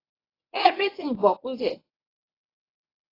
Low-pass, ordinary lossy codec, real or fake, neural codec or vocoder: 5.4 kHz; AAC, 24 kbps; fake; codec, 24 kHz, 0.9 kbps, WavTokenizer, medium speech release version 1